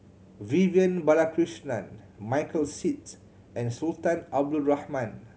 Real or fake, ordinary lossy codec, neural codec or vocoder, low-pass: real; none; none; none